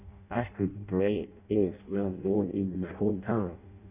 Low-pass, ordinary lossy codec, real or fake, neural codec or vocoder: 3.6 kHz; none; fake; codec, 16 kHz in and 24 kHz out, 0.6 kbps, FireRedTTS-2 codec